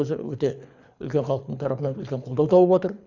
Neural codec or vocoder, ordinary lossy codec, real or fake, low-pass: codec, 24 kHz, 6 kbps, HILCodec; none; fake; 7.2 kHz